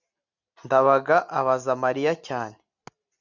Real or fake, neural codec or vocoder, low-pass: real; none; 7.2 kHz